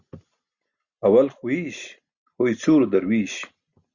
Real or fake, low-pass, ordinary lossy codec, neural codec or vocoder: real; 7.2 kHz; Opus, 64 kbps; none